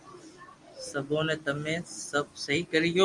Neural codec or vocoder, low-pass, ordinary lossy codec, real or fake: none; 10.8 kHz; Opus, 32 kbps; real